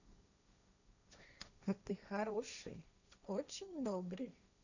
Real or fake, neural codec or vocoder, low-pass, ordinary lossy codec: fake; codec, 16 kHz, 1.1 kbps, Voila-Tokenizer; 7.2 kHz; none